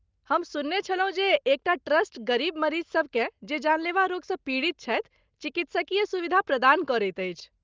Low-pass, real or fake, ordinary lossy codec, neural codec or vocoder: 7.2 kHz; real; Opus, 24 kbps; none